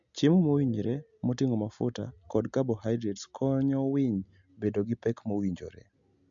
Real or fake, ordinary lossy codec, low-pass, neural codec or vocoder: real; MP3, 64 kbps; 7.2 kHz; none